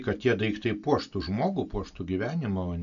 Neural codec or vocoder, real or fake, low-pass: none; real; 7.2 kHz